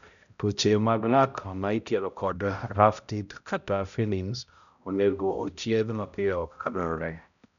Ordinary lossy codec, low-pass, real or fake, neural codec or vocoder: none; 7.2 kHz; fake; codec, 16 kHz, 0.5 kbps, X-Codec, HuBERT features, trained on balanced general audio